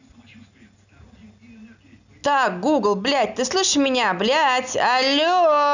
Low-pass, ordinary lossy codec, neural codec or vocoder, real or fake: 7.2 kHz; none; none; real